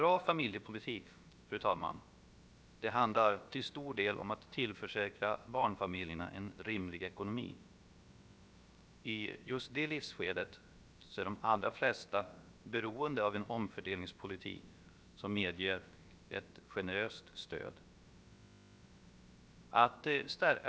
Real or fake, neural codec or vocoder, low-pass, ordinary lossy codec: fake; codec, 16 kHz, about 1 kbps, DyCAST, with the encoder's durations; none; none